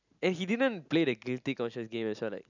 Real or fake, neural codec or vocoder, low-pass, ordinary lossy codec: real; none; 7.2 kHz; none